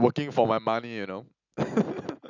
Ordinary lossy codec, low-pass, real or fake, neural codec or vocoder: none; 7.2 kHz; real; none